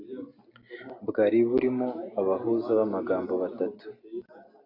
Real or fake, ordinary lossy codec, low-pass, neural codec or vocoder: real; AAC, 32 kbps; 5.4 kHz; none